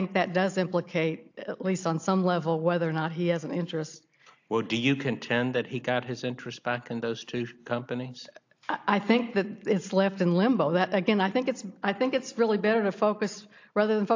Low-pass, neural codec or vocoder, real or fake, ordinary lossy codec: 7.2 kHz; none; real; AAC, 48 kbps